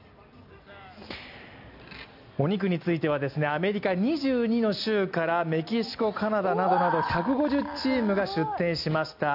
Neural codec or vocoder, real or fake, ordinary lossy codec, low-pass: none; real; none; 5.4 kHz